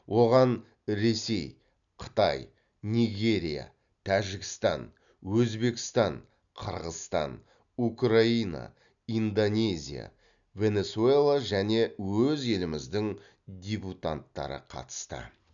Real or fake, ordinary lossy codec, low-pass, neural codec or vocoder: real; none; 7.2 kHz; none